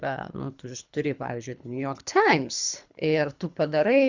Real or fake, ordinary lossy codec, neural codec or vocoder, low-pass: fake; Opus, 64 kbps; codec, 24 kHz, 3 kbps, HILCodec; 7.2 kHz